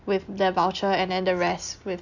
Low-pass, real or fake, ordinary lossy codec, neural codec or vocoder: 7.2 kHz; real; none; none